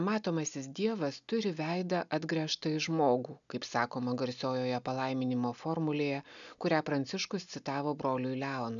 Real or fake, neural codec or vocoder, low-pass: real; none; 7.2 kHz